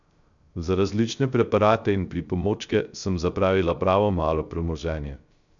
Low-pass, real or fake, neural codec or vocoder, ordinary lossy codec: 7.2 kHz; fake; codec, 16 kHz, 0.3 kbps, FocalCodec; none